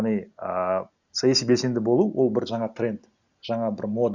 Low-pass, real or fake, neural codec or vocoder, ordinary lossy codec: 7.2 kHz; real; none; Opus, 64 kbps